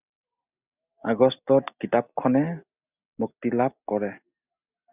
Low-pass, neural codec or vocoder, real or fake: 3.6 kHz; none; real